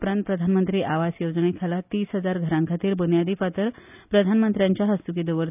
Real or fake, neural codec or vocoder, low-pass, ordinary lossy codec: real; none; 3.6 kHz; none